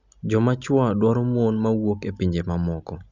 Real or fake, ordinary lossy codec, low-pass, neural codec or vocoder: real; none; 7.2 kHz; none